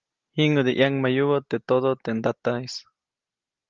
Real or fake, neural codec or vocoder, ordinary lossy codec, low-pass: real; none; Opus, 32 kbps; 7.2 kHz